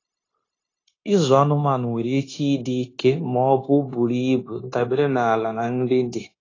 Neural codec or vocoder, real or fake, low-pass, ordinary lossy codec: codec, 16 kHz, 0.9 kbps, LongCat-Audio-Codec; fake; 7.2 kHz; AAC, 32 kbps